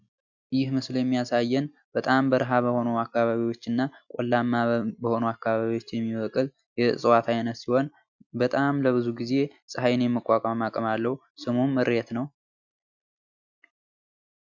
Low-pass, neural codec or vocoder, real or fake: 7.2 kHz; none; real